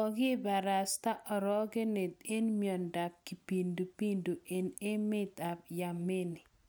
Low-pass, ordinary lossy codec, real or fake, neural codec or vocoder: none; none; real; none